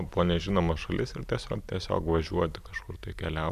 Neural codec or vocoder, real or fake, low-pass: none; real; 14.4 kHz